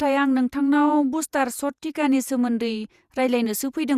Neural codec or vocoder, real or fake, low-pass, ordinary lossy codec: vocoder, 48 kHz, 128 mel bands, Vocos; fake; 14.4 kHz; Opus, 64 kbps